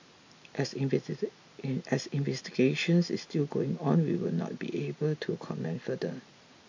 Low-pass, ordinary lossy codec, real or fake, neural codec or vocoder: 7.2 kHz; MP3, 48 kbps; real; none